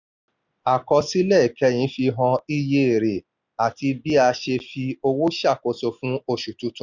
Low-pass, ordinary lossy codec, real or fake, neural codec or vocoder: 7.2 kHz; none; real; none